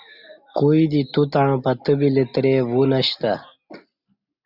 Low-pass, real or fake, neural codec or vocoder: 5.4 kHz; real; none